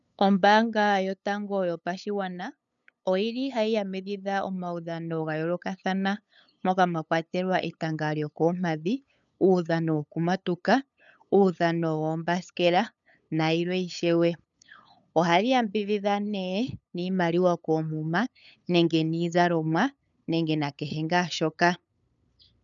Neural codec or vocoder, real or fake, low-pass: codec, 16 kHz, 8 kbps, FunCodec, trained on LibriTTS, 25 frames a second; fake; 7.2 kHz